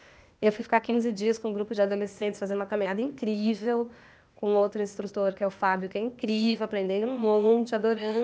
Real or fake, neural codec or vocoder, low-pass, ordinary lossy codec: fake; codec, 16 kHz, 0.8 kbps, ZipCodec; none; none